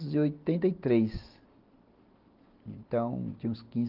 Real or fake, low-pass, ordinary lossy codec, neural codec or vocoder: real; 5.4 kHz; Opus, 32 kbps; none